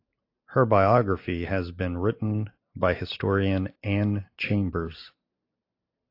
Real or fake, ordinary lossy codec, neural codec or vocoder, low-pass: real; AAC, 32 kbps; none; 5.4 kHz